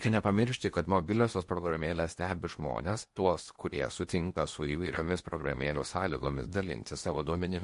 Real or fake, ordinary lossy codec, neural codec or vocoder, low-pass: fake; MP3, 48 kbps; codec, 16 kHz in and 24 kHz out, 0.8 kbps, FocalCodec, streaming, 65536 codes; 10.8 kHz